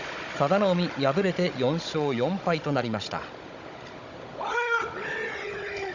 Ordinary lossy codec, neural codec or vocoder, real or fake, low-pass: none; codec, 16 kHz, 16 kbps, FunCodec, trained on Chinese and English, 50 frames a second; fake; 7.2 kHz